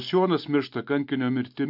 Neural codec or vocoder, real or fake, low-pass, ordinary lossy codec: none; real; 5.4 kHz; AAC, 48 kbps